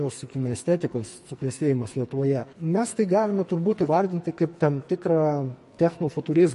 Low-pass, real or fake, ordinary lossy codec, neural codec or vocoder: 14.4 kHz; fake; MP3, 48 kbps; codec, 44.1 kHz, 2.6 kbps, SNAC